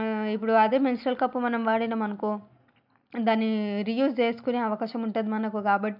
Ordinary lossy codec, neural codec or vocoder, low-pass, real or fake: none; none; 5.4 kHz; real